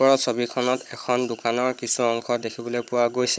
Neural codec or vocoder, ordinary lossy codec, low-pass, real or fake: codec, 16 kHz, 16 kbps, FunCodec, trained on Chinese and English, 50 frames a second; none; none; fake